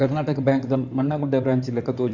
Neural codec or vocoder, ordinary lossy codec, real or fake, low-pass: codec, 16 kHz in and 24 kHz out, 2.2 kbps, FireRedTTS-2 codec; none; fake; 7.2 kHz